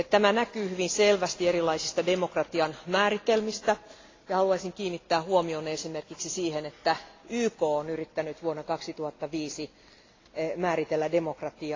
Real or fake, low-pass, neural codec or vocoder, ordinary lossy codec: real; 7.2 kHz; none; AAC, 32 kbps